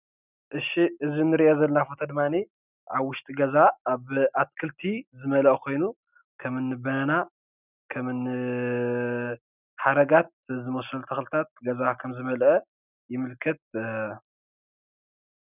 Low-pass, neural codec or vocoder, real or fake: 3.6 kHz; none; real